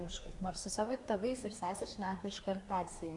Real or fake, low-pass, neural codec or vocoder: fake; 10.8 kHz; codec, 24 kHz, 1 kbps, SNAC